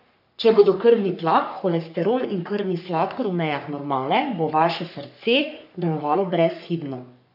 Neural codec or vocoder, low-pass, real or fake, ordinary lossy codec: codec, 44.1 kHz, 3.4 kbps, Pupu-Codec; 5.4 kHz; fake; none